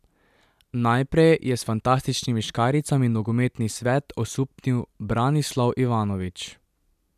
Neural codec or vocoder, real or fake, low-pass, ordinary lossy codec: none; real; 14.4 kHz; none